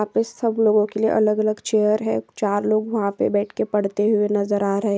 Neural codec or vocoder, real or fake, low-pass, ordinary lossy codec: none; real; none; none